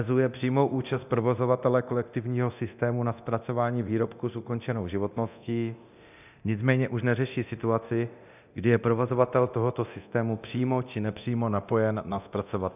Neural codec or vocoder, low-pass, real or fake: codec, 24 kHz, 0.9 kbps, DualCodec; 3.6 kHz; fake